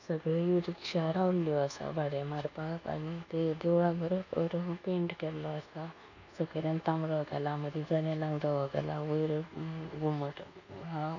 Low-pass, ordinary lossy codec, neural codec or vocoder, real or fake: 7.2 kHz; none; codec, 24 kHz, 1.2 kbps, DualCodec; fake